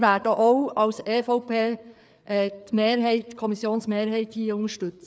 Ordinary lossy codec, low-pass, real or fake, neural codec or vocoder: none; none; fake; codec, 16 kHz, 4 kbps, FreqCodec, larger model